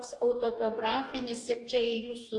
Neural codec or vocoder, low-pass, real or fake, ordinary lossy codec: codec, 44.1 kHz, 2.6 kbps, DAC; 10.8 kHz; fake; AAC, 48 kbps